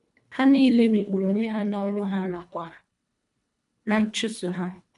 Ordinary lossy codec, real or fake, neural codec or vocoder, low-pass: none; fake; codec, 24 kHz, 1.5 kbps, HILCodec; 10.8 kHz